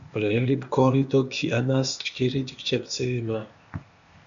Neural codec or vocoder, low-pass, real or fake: codec, 16 kHz, 0.8 kbps, ZipCodec; 7.2 kHz; fake